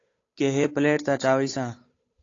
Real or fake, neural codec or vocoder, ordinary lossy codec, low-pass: fake; codec, 16 kHz, 8 kbps, FunCodec, trained on Chinese and English, 25 frames a second; AAC, 32 kbps; 7.2 kHz